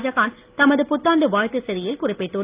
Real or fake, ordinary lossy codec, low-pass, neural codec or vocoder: real; Opus, 24 kbps; 3.6 kHz; none